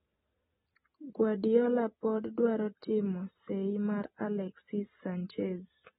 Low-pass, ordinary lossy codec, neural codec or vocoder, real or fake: 10.8 kHz; AAC, 16 kbps; none; real